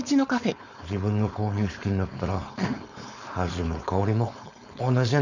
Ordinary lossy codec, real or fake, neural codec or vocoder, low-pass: none; fake; codec, 16 kHz, 4.8 kbps, FACodec; 7.2 kHz